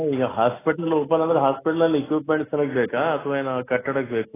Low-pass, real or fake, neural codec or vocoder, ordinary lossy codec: 3.6 kHz; real; none; AAC, 16 kbps